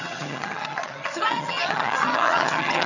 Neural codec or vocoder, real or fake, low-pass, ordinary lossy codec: vocoder, 22.05 kHz, 80 mel bands, HiFi-GAN; fake; 7.2 kHz; none